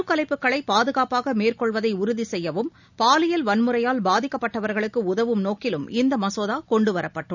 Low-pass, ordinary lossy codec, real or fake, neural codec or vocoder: 7.2 kHz; none; real; none